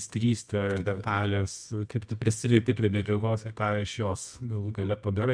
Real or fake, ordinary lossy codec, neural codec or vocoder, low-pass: fake; MP3, 96 kbps; codec, 24 kHz, 0.9 kbps, WavTokenizer, medium music audio release; 9.9 kHz